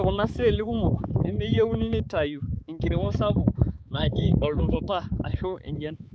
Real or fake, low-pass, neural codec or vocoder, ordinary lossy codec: fake; none; codec, 16 kHz, 4 kbps, X-Codec, HuBERT features, trained on balanced general audio; none